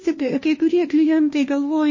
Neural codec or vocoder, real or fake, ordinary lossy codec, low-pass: codec, 16 kHz, 1 kbps, FunCodec, trained on LibriTTS, 50 frames a second; fake; MP3, 32 kbps; 7.2 kHz